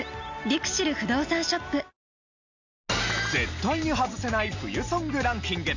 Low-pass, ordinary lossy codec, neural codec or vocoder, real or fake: 7.2 kHz; none; none; real